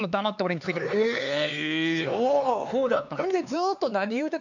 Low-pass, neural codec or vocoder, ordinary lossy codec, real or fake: 7.2 kHz; codec, 16 kHz, 4 kbps, X-Codec, HuBERT features, trained on LibriSpeech; none; fake